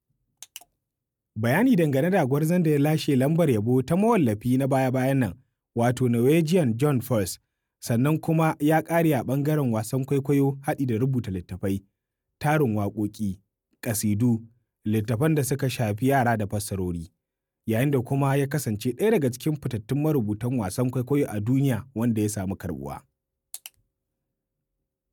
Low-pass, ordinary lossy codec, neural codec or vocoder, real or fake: 19.8 kHz; none; none; real